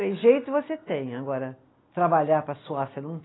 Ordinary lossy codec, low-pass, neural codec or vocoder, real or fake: AAC, 16 kbps; 7.2 kHz; none; real